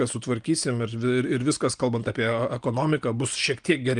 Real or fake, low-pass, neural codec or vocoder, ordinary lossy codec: real; 9.9 kHz; none; Opus, 32 kbps